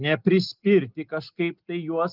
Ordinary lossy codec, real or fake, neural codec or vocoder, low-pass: Opus, 32 kbps; real; none; 5.4 kHz